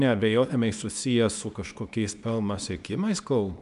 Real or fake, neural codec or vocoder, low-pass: fake; codec, 24 kHz, 0.9 kbps, WavTokenizer, small release; 10.8 kHz